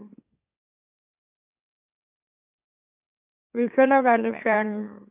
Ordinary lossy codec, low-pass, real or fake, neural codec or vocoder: AAC, 32 kbps; 3.6 kHz; fake; autoencoder, 44.1 kHz, a latent of 192 numbers a frame, MeloTTS